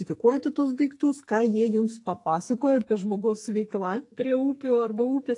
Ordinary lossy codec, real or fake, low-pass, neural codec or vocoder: AAC, 48 kbps; fake; 10.8 kHz; codec, 44.1 kHz, 2.6 kbps, SNAC